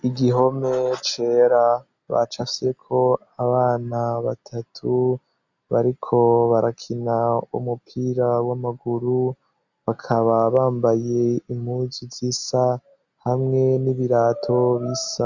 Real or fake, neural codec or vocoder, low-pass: real; none; 7.2 kHz